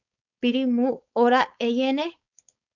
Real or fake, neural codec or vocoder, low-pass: fake; codec, 16 kHz, 4.8 kbps, FACodec; 7.2 kHz